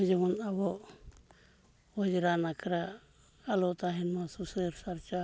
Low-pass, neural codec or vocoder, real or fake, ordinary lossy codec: none; none; real; none